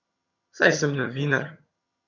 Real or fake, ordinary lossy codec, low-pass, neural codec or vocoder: fake; none; 7.2 kHz; vocoder, 22.05 kHz, 80 mel bands, HiFi-GAN